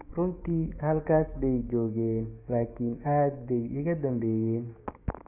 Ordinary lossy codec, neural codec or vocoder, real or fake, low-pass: AAC, 32 kbps; codec, 16 kHz, 6 kbps, DAC; fake; 3.6 kHz